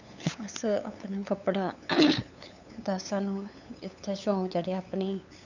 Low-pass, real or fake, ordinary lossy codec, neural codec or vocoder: 7.2 kHz; fake; none; codec, 16 kHz, 8 kbps, FunCodec, trained on LibriTTS, 25 frames a second